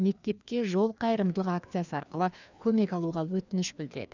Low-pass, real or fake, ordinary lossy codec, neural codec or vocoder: 7.2 kHz; fake; none; codec, 16 kHz, 2 kbps, FreqCodec, larger model